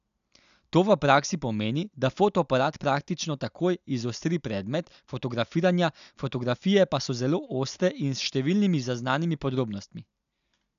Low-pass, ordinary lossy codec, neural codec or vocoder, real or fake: 7.2 kHz; none; none; real